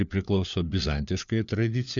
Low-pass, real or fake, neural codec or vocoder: 7.2 kHz; fake; codec, 16 kHz, 4 kbps, FunCodec, trained on LibriTTS, 50 frames a second